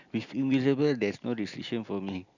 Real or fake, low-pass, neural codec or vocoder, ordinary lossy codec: real; 7.2 kHz; none; none